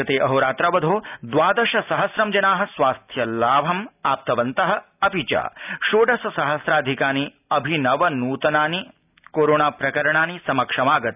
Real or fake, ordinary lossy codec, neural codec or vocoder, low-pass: real; none; none; 3.6 kHz